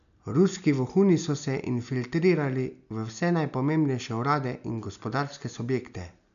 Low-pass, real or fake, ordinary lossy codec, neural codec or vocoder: 7.2 kHz; real; none; none